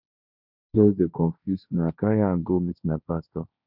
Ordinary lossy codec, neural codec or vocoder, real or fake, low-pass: none; codec, 16 kHz, 1.1 kbps, Voila-Tokenizer; fake; 5.4 kHz